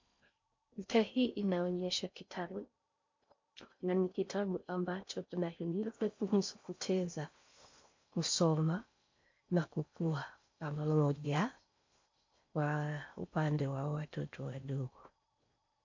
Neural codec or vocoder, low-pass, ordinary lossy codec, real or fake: codec, 16 kHz in and 24 kHz out, 0.6 kbps, FocalCodec, streaming, 4096 codes; 7.2 kHz; MP3, 48 kbps; fake